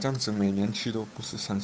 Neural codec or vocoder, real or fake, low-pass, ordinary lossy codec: codec, 16 kHz, 8 kbps, FunCodec, trained on Chinese and English, 25 frames a second; fake; none; none